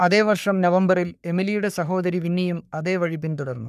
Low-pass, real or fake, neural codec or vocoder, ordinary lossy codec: 14.4 kHz; fake; codec, 44.1 kHz, 3.4 kbps, Pupu-Codec; none